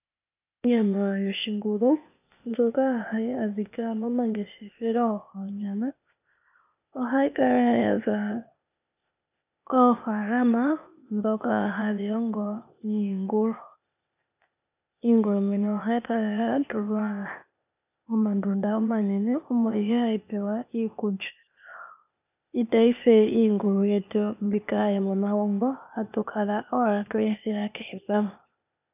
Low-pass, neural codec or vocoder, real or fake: 3.6 kHz; codec, 16 kHz, 0.8 kbps, ZipCodec; fake